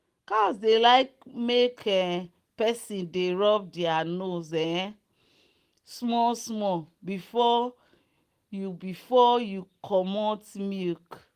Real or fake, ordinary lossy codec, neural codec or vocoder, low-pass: real; Opus, 24 kbps; none; 14.4 kHz